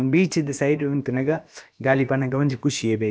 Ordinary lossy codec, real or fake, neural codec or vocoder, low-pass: none; fake; codec, 16 kHz, about 1 kbps, DyCAST, with the encoder's durations; none